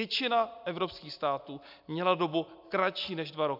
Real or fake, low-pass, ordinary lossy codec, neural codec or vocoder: real; 5.4 kHz; AAC, 48 kbps; none